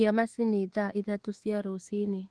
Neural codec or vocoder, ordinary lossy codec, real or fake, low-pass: codec, 24 kHz, 1.2 kbps, DualCodec; Opus, 16 kbps; fake; 10.8 kHz